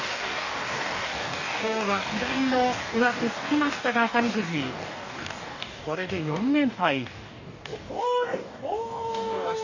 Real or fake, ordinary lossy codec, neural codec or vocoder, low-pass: fake; none; codec, 44.1 kHz, 2.6 kbps, DAC; 7.2 kHz